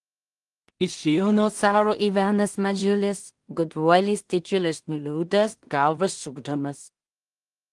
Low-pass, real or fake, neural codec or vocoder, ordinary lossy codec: 10.8 kHz; fake; codec, 16 kHz in and 24 kHz out, 0.4 kbps, LongCat-Audio-Codec, two codebook decoder; Opus, 24 kbps